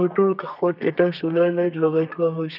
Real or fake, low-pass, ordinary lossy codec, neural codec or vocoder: fake; 5.4 kHz; none; codec, 32 kHz, 1.9 kbps, SNAC